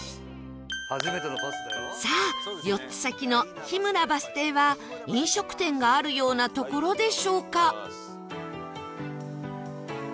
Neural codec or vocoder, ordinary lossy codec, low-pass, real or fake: none; none; none; real